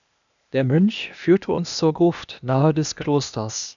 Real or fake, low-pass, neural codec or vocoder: fake; 7.2 kHz; codec, 16 kHz, 0.8 kbps, ZipCodec